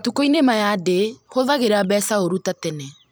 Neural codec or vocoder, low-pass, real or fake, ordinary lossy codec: vocoder, 44.1 kHz, 128 mel bands every 256 samples, BigVGAN v2; none; fake; none